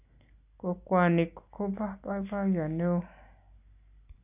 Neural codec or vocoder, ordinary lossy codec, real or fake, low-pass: none; none; real; 3.6 kHz